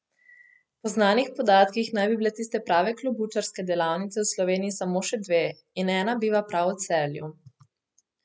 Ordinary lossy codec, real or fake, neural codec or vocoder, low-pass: none; real; none; none